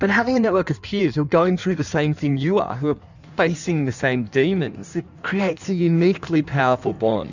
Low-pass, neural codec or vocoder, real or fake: 7.2 kHz; codec, 16 kHz in and 24 kHz out, 1.1 kbps, FireRedTTS-2 codec; fake